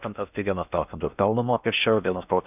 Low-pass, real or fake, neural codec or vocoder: 3.6 kHz; fake; codec, 16 kHz in and 24 kHz out, 0.6 kbps, FocalCodec, streaming, 4096 codes